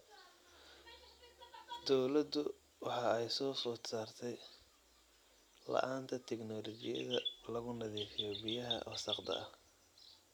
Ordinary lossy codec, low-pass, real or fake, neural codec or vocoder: none; none; real; none